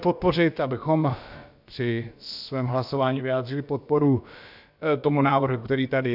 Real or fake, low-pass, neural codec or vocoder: fake; 5.4 kHz; codec, 16 kHz, about 1 kbps, DyCAST, with the encoder's durations